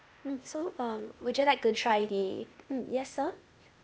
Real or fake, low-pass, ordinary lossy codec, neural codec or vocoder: fake; none; none; codec, 16 kHz, 0.8 kbps, ZipCodec